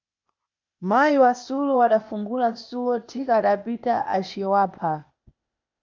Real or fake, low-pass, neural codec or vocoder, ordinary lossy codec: fake; 7.2 kHz; codec, 16 kHz, 0.8 kbps, ZipCodec; MP3, 64 kbps